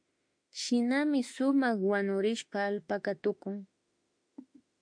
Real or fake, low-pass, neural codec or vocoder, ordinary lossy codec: fake; 9.9 kHz; autoencoder, 48 kHz, 32 numbers a frame, DAC-VAE, trained on Japanese speech; MP3, 48 kbps